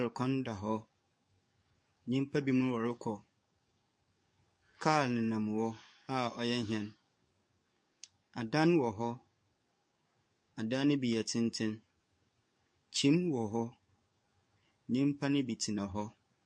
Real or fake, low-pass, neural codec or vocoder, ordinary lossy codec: fake; 9.9 kHz; codec, 44.1 kHz, 7.8 kbps, DAC; MP3, 48 kbps